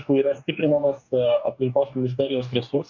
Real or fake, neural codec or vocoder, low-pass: fake; codec, 44.1 kHz, 2.6 kbps, DAC; 7.2 kHz